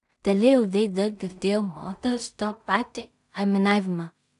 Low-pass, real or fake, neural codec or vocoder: 10.8 kHz; fake; codec, 16 kHz in and 24 kHz out, 0.4 kbps, LongCat-Audio-Codec, two codebook decoder